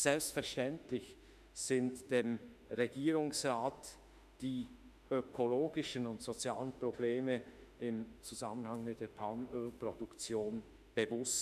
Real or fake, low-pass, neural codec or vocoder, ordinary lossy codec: fake; 14.4 kHz; autoencoder, 48 kHz, 32 numbers a frame, DAC-VAE, trained on Japanese speech; none